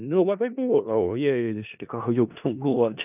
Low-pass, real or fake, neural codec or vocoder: 3.6 kHz; fake; codec, 16 kHz in and 24 kHz out, 0.4 kbps, LongCat-Audio-Codec, four codebook decoder